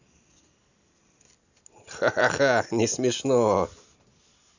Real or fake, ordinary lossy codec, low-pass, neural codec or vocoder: real; none; 7.2 kHz; none